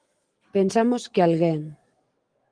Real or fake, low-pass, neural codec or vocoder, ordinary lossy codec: fake; 9.9 kHz; autoencoder, 48 kHz, 128 numbers a frame, DAC-VAE, trained on Japanese speech; Opus, 24 kbps